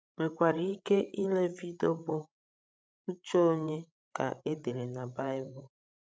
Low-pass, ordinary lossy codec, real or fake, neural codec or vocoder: none; none; fake; codec, 16 kHz, 16 kbps, FreqCodec, larger model